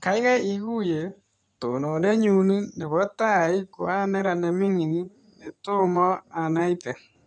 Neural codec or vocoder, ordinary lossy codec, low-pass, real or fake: codec, 16 kHz in and 24 kHz out, 2.2 kbps, FireRedTTS-2 codec; none; 9.9 kHz; fake